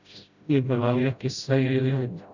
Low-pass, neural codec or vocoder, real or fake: 7.2 kHz; codec, 16 kHz, 0.5 kbps, FreqCodec, smaller model; fake